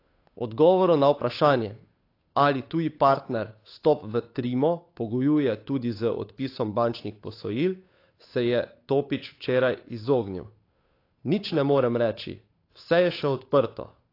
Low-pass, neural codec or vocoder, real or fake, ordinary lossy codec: 5.4 kHz; codec, 16 kHz, 8 kbps, FunCodec, trained on Chinese and English, 25 frames a second; fake; AAC, 32 kbps